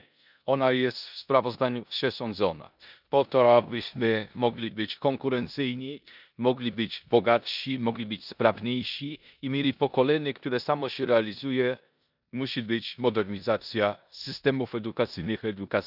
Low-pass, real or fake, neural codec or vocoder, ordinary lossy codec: 5.4 kHz; fake; codec, 16 kHz in and 24 kHz out, 0.9 kbps, LongCat-Audio-Codec, four codebook decoder; none